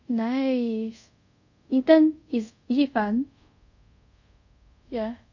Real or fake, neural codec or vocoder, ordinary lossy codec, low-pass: fake; codec, 24 kHz, 0.5 kbps, DualCodec; none; 7.2 kHz